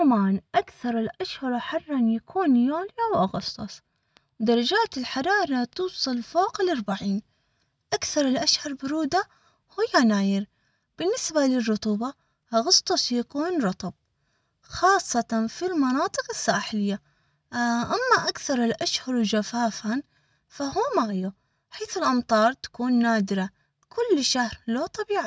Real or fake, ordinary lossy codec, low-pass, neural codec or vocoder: real; none; none; none